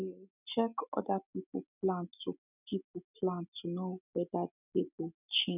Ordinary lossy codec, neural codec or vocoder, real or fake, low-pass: none; none; real; 3.6 kHz